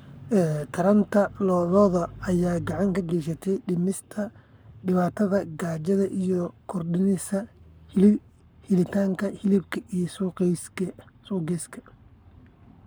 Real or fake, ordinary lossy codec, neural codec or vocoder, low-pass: fake; none; codec, 44.1 kHz, 7.8 kbps, Pupu-Codec; none